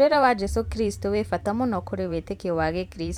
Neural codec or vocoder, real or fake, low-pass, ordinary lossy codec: vocoder, 44.1 kHz, 128 mel bands every 512 samples, BigVGAN v2; fake; 19.8 kHz; none